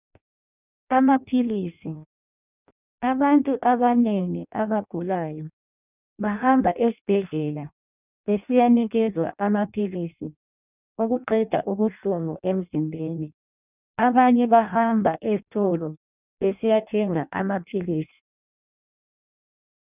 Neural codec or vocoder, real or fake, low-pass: codec, 16 kHz in and 24 kHz out, 0.6 kbps, FireRedTTS-2 codec; fake; 3.6 kHz